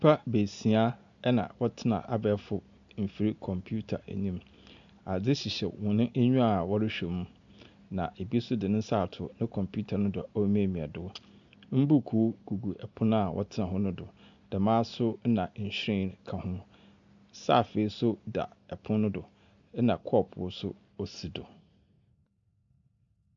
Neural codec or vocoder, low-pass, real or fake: none; 7.2 kHz; real